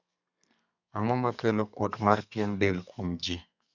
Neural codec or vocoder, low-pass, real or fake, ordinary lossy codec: codec, 32 kHz, 1.9 kbps, SNAC; 7.2 kHz; fake; none